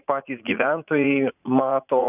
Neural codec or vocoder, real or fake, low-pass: vocoder, 44.1 kHz, 80 mel bands, Vocos; fake; 3.6 kHz